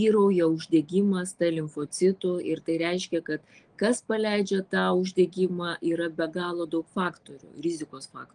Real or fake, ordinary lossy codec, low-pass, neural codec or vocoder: real; Opus, 24 kbps; 9.9 kHz; none